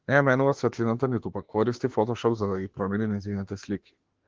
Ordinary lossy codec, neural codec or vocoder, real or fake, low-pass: Opus, 16 kbps; autoencoder, 48 kHz, 32 numbers a frame, DAC-VAE, trained on Japanese speech; fake; 7.2 kHz